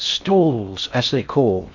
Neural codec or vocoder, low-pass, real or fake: codec, 16 kHz in and 24 kHz out, 0.6 kbps, FocalCodec, streaming, 4096 codes; 7.2 kHz; fake